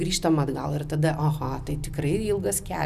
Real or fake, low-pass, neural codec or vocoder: real; 14.4 kHz; none